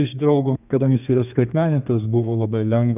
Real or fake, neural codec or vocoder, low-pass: fake; codec, 32 kHz, 1.9 kbps, SNAC; 3.6 kHz